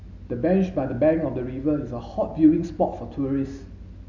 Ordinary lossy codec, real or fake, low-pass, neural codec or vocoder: none; real; 7.2 kHz; none